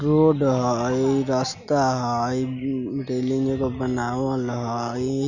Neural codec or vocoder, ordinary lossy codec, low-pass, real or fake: none; none; 7.2 kHz; real